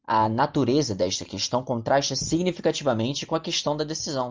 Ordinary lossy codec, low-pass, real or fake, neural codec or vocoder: Opus, 32 kbps; 7.2 kHz; real; none